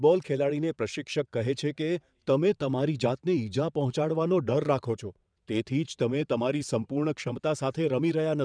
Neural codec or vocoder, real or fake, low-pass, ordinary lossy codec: vocoder, 22.05 kHz, 80 mel bands, WaveNeXt; fake; 9.9 kHz; none